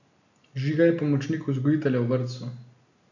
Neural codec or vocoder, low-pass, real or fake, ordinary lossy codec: none; 7.2 kHz; real; none